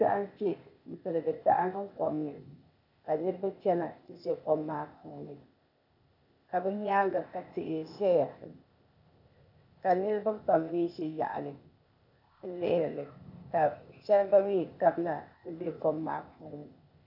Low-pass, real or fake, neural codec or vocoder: 5.4 kHz; fake; codec, 16 kHz, 0.8 kbps, ZipCodec